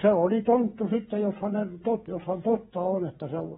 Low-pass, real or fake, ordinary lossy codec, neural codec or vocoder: 7.2 kHz; fake; AAC, 16 kbps; codec, 16 kHz, 16 kbps, FreqCodec, smaller model